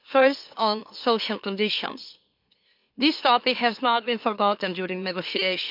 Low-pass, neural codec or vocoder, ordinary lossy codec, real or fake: 5.4 kHz; autoencoder, 44.1 kHz, a latent of 192 numbers a frame, MeloTTS; MP3, 48 kbps; fake